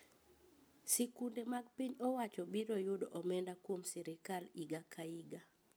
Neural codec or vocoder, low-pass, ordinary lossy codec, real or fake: none; none; none; real